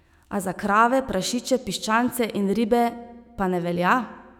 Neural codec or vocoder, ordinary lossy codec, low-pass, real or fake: autoencoder, 48 kHz, 128 numbers a frame, DAC-VAE, trained on Japanese speech; none; 19.8 kHz; fake